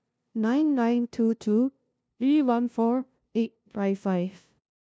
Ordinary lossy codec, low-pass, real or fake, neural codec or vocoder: none; none; fake; codec, 16 kHz, 0.5 kbps, FunCodec, trained on LibriTTS, 25 frames a second